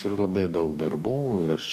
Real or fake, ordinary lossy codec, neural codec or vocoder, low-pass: fake; MP3, 96 kbps; codec, 44.1 kHz, 2.6 kbps, DAC; 14.4 kHz